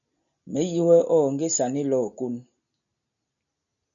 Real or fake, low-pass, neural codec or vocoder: real; 7.2 kHz; none